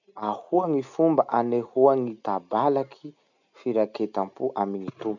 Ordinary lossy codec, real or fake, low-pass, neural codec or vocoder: none; real; 7.2 kHz; none